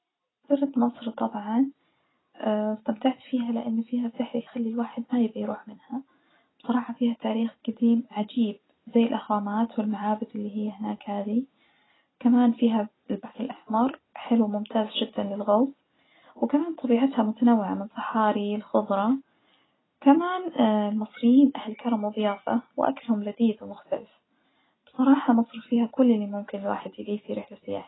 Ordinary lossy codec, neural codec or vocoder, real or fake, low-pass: AAC, 16 kbps; none; real; 7.2 kHz